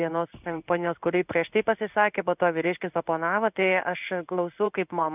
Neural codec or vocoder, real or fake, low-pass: codec, 16 kHz in and 24 kHz out, 1 kbps, XY-Tokenizer; fake; 3.6 kHz